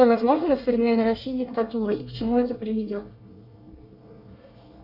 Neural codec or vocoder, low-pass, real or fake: codec, 24 kHz, 1 kbps, SNAC; 5.4 kHz; fake